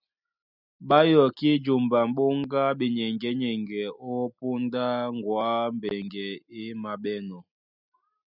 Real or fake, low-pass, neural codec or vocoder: real; 5.4 kHz; none